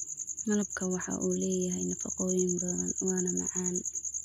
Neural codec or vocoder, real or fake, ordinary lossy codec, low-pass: none; real; none; 19.8 kHz